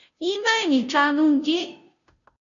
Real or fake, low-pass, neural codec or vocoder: fake; 7.2 kHz; codec, 16 kHz, 0.5 kbps, FunCodec, trained on Chinese and English, 25 frames a second